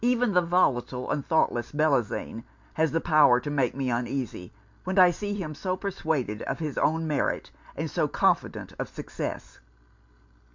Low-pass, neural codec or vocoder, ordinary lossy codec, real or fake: 7.2 kHz; none; MP3, 64 kbps; real